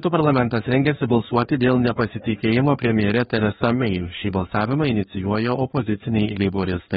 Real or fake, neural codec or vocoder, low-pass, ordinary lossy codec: fake; codec, 16 kHz, 2 kbps, FreqCodec, larger model; 7.2 kHz; AAC, 16 kbps